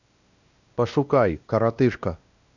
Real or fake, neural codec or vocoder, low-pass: fake; codec, 16 kHz, 1 kbps, X-Codec, WavLM features, trained on Multilingual LibriSpeech; 7.2 kHz